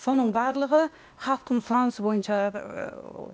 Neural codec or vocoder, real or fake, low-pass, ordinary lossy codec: codec, 16 kHz, 0.8 kbps, ZipCodec; fake; none; none